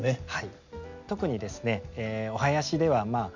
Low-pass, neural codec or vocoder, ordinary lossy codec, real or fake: 7.2 kHz; none; none; real